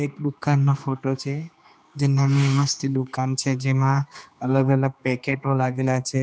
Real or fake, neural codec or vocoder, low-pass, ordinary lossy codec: fake; codec, 16 kHz, 2 kbps, X-Codec, HuBERT features, trained on general audio; none; none